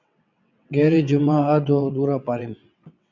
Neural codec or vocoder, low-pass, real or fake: vocoder, 22.05 kHz, 80 mel bands, WaveNeXt; 7.2 kHz; fake